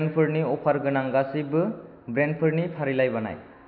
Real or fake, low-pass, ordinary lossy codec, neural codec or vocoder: real; 5.4 kHz; none; none